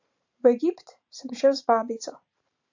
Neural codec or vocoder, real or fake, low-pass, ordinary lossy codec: vocoder, 44.1 kHz, 128 mel bands every 512 samples, BigVGAN v2; fake; 7.2 kHz; AAC, 48 kbps